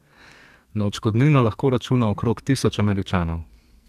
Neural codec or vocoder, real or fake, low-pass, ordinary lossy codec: codec, 44.1 kHz, 2.6 kbps, SNAC; fake; 14.4 kHz; AAC, 96 kbps